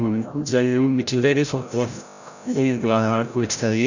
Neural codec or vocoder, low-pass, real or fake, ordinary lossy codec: codec, 16 kHz, 0.5 kbps, FreqCodec, larger model; 7.2 kHz; fake; none